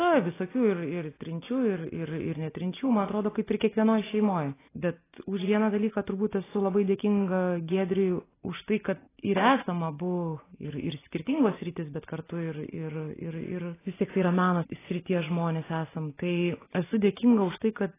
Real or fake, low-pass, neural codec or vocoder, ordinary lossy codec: real; 3.6 kHz; none; AAC, 16 kbps